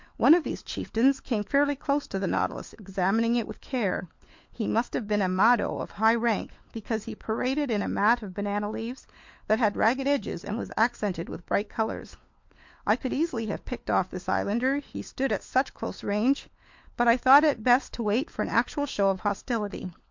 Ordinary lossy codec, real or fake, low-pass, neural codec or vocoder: MP3, 48 kbps; fake; 7.2 kHz; codec, 16 kHz, 4 kbps, FunCodec, trained on LibriTTS, 50 frames a second